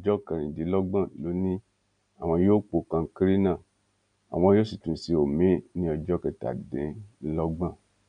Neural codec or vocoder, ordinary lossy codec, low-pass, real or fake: none; none; 9.9 kHz; real